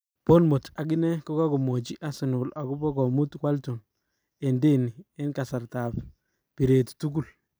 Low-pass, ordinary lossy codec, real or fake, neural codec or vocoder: none; none; real; none